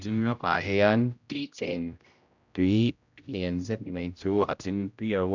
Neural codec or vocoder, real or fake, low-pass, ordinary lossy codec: codec, 16 kHz, 0.5 kbps, X-Codec, HuBERT features, trained on general audio; fake; 7.2 kHz; none